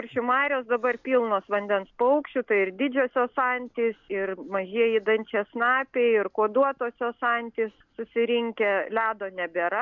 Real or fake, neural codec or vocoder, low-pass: real; none; 7.2 kHz